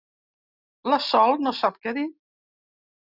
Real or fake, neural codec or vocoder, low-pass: real; none; 5.4 kHz